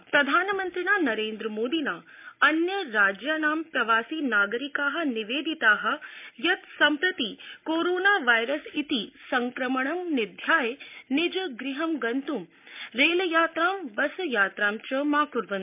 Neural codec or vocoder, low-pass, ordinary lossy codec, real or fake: none; 3.6 kHz; MP3, 32 kbps; real